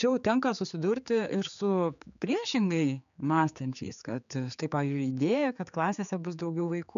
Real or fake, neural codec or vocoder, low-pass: fake; codec, 16 kHz, 4 kbps, X-Codec, HuBERT features, trained on general audio; 7.2 kHz